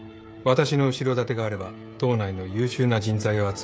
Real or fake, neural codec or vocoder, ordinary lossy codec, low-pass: fake; codec, 16 kHz, 16 kbps, FreqCodec, smaller model; none; none